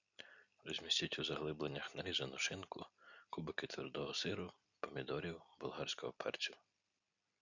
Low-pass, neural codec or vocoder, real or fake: 7.2 kHz; none; real